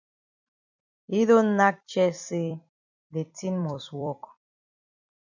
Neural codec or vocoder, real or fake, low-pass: vocoder, 44.1 kHz, 128 mel bands every 256 samples, BigVGAN v2; fake; 7.2 kHz